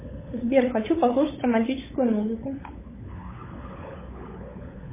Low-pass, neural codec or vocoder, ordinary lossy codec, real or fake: 3.6 kHz; codec, 16 kHz, 16 kbps, FunCodec, trained on LibriTTS, 50 frames a second; MP3, 16 kbps; fake